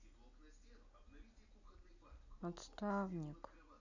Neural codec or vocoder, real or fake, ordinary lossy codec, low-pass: none; real; none; 7.2 kHz